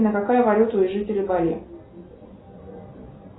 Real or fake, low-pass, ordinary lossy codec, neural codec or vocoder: real; 7.2 kHz; AAC, 16 kbps; none